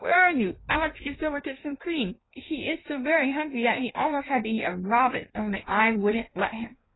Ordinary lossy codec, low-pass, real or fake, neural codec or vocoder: AAC, 16 kbps; 7.2 kHz; fake; codec, 16 kHz in and 24 kHz out, 1.1 kbps, FireRedTTS-2 codec